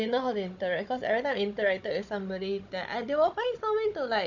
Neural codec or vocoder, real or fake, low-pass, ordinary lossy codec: codec, 16 kHz, 16 kbps, FunCodec, trained on Chinese and English, 50 frames a second; fake; 7.2 kHz; none